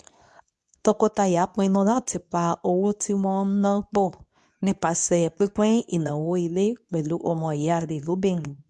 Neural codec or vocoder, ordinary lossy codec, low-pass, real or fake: codec, 24 kHz, 0.9 kbps, WavTokenizer, medium speech release version 2; none; none; fake